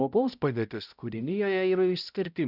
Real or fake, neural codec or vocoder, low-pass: fake; codec, 16 kHz, 0.5 kbps, X-Codec, HuBERT features, trained on balanced general audio; 5.4 kHz